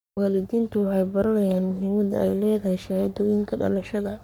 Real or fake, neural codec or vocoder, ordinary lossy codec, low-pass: fake; codec, 44.1 kHz, 3.4 kbps, Pupu-Codec; none; none